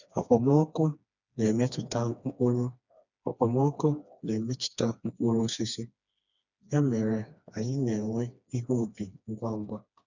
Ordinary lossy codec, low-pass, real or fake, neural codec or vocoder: none; 7.2 kHz; fake; codec, 16 kHz, 2 kbps, FreqCodec, smaller model